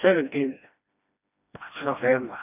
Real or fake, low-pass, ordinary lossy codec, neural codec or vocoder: fake; 3.6 kHz; none; codec, 16 kHz, 1 kbps, FreqCodec, smaller model